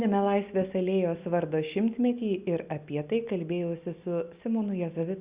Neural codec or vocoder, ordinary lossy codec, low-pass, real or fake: none; Opus, 64 kbps; 3.6 kHz; real